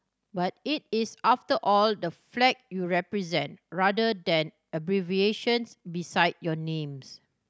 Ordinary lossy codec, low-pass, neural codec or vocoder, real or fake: none; none; none; real